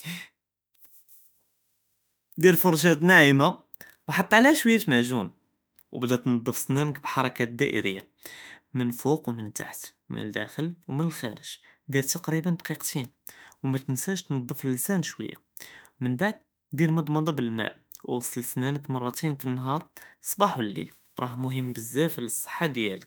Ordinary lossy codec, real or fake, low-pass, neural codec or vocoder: none; fake; none; autoencoder, 48 kHz, 32 numbers a frame, DAC-VAE, trained on Japanese speech